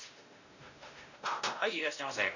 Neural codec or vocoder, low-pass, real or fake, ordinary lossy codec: codec, 16 kHz, 1 kbps, X-Codec, WavLM features, trained on Multilingual LibriSpeech; 7.2 kHz; fake; none